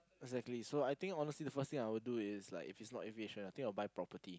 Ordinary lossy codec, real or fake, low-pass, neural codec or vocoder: none; real; none; none